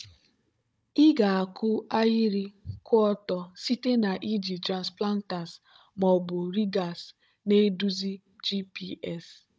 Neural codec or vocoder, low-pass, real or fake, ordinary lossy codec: codec, 16 kHz, 16 kbps, FunCodec, trained on Chinese and English, 50 frames a second; none; fake; none